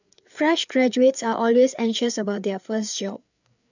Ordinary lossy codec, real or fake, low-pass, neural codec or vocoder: none; fake; 7.2 kHz; codec, 16 kHz, 4 kbps, FreqCodec, larger model